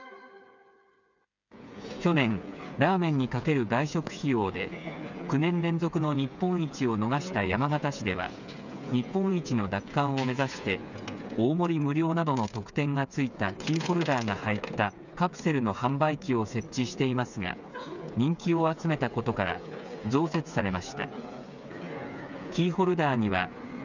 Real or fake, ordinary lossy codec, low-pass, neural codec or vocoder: fake; none; 7.2 kHz; codec, 16 kHz, 8 kbps, FreqCodec, smaller model